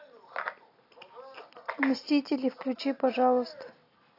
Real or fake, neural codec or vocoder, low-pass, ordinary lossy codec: real; none; 5.4 kHz; AAC, 32 kbps